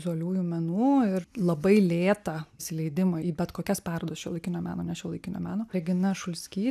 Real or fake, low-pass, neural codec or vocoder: real; 14.4 kHz; none